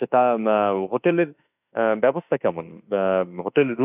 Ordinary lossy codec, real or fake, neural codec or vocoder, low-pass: none; fake; autoencoder, 48 kHz, 32 numbers a frame, DAC-VAE, trained on Japanese speech; 3.6 kHz